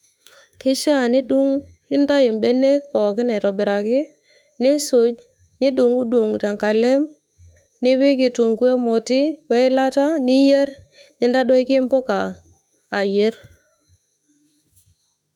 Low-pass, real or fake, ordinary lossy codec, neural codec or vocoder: 19.8 kHz; fake; none; autoencoder, 48 kHz, 32 numbers a frame, DAC-VAE, trained on Japanese speech